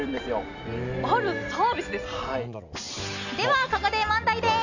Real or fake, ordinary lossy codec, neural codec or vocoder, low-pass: real; none; none; 7.2 kHz